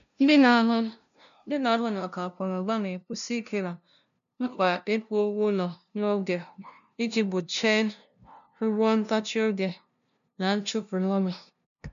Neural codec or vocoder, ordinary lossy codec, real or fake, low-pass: codec, 16 kHz, 0.5 kbps, FunCodec, trained on LibriTTS, 25 frames a second; none; fake; 7.2 kHz